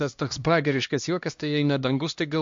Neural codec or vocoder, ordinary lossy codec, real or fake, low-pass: codec, 16 kHz, 1 kbps, X-Codec, HuBERT features, trained on LibriSpeech; MP3, 48 kbps; fake; 7.2 kHz